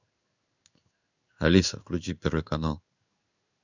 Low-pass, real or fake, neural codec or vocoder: 7.2 kHz; fake; codec, 16 kHz in and 24 kHz out, 1 kbps, XY-Tokenizer